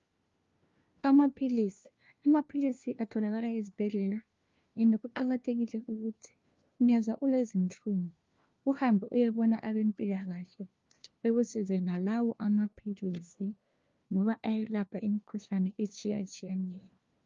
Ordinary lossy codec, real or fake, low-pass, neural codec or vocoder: Opus, 32 kbps; fake; 7.2 kHz; codec, 16 kHz, 1 kbps, FunCodec, trained on LibriTTS, 50 frames a second